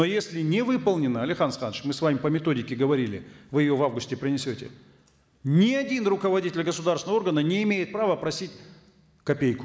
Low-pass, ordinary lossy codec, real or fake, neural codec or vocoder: none; none; real; none